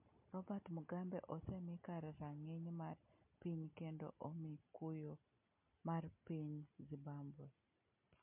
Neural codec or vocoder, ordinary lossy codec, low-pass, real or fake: none; MP3, 32 kbps; 3.6 kHz; real